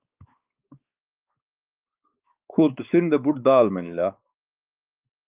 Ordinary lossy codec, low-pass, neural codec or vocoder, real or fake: Opus, 24 kbps; 3.6 kHz; codec, 16 kHz, 4 kbps, X-Codec, WavLM features, trained on Multilingual LibriSpeech; fake